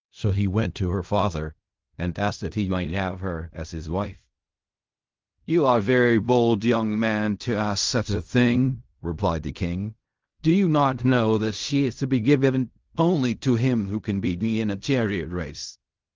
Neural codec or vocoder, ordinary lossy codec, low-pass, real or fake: codec, 16 kHz in and 24 kHz out, 0.4 kbps, LongCat-Audio-Codec, fine tuned four codebook decoder; Opus, 32 kbps; 7.2 kHz; fake